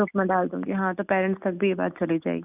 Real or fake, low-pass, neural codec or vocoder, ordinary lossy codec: real; 3.6 kHz; none; none